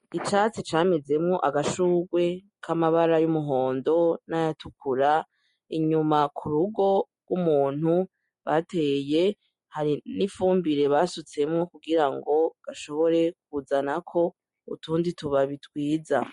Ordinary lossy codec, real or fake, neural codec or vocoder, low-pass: MP3, 48 kbps; real; none; 19.8 kHz